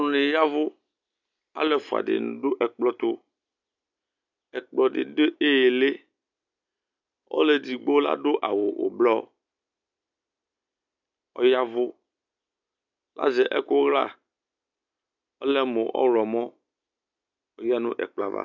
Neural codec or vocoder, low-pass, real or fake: none; 7.2 kHz; real